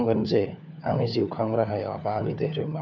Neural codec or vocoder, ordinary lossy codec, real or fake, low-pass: codec, 16 kHz, 4 kbps, FunCodec, trained on LibriTTS, 50 frames a second; none; fake; 7.2 kHz